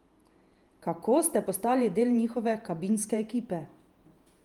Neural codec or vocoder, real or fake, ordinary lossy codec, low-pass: vocoder, 48 kHz, 128 mel bands, Vocos; fake; Opus, 32 kbps; 19.8 kHz